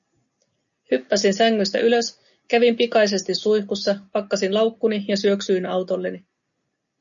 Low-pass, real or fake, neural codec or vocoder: 7.2 kHz; real; none